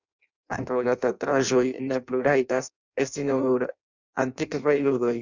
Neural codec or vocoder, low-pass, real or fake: codec, 16 kHz in and 24 kHz out, 0.6 kbps, FireRedTTS-2 codec; 7.2 kHz; fake